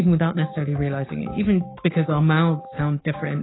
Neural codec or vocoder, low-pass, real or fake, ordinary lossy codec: codec, 16 kHz, 6 kbps, DAC; 7.2 kHz; fake; AAC, 16 kbps